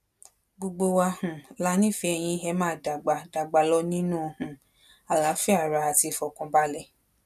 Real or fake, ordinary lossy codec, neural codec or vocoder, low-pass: real; none; none; 14.4 kHz